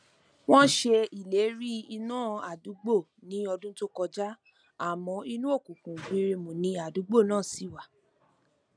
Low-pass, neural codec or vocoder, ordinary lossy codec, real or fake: 9.9 kHz; none; none; real